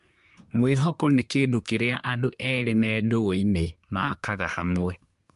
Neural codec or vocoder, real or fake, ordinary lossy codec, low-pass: codec, 24 kHz, 1 kbps, SNAC; fake; MP3, 64 kbps; 10.8 kHz